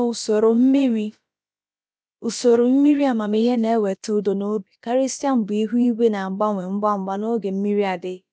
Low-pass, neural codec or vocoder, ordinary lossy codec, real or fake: none; codec, 16 kHz, about 1 kbps, DyCAST, with the encoder's durations; none; fake